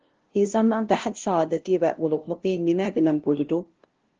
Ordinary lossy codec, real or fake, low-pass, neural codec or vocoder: Opus, 16 kbps; fake; 7.2 kHz; codec, 16 kHz, 0.5 kbps, FunCodec, trained on LibriTTS, 25 frames a second